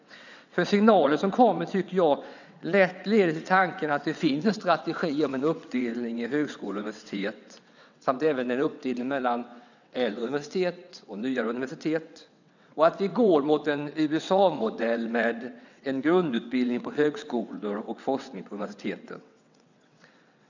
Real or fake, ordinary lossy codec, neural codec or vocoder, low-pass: fake; none; vocoder, 22.05 kHz, 80 mel bands, WaveNeXt; 7.2 kHz